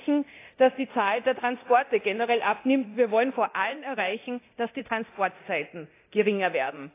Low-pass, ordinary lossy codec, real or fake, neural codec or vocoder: 3.6 kHz; AAC, 24 kbps; fake; codec, 24 kHz, 0.9 kbps, DualCodec